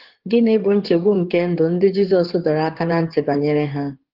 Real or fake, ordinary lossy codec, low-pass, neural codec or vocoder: fake; Opus, 16 kbps; 5.4 kHz; codec, 16 kHz in and 24 kHz out, 2.2 kbps, FireRedTTS-2 codec